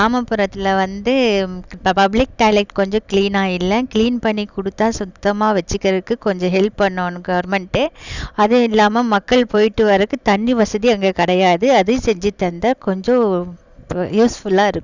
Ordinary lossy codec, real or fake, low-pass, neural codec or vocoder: none; real; 7.2 kHz; none